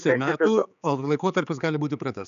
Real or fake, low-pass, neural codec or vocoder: fake; 7.2 kHz; codec, 16 kHz, 4 kbps, X-Codec, HuBERT features, trained on general audio